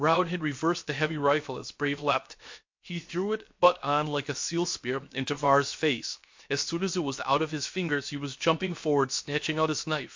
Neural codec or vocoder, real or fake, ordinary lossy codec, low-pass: codec, 16 kHz, 0.7 kbps, FocalCodec; fake; MP3, 48 kbps; 7.2 kHz